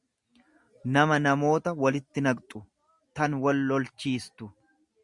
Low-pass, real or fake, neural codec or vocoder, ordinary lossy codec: 10.8 kHz; real; none; Opus, 64 kbps